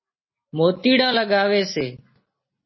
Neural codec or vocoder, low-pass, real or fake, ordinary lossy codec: none; 7.2 kHz; real; MP3, 24 kbps